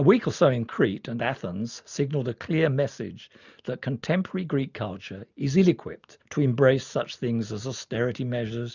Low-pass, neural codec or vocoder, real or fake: 7.2 kHz; none; real